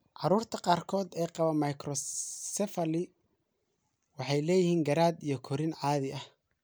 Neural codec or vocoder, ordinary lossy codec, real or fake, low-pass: none; none; real; none